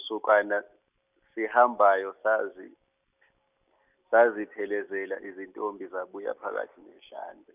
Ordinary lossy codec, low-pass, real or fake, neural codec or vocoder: none; 3.6 kHz; real; none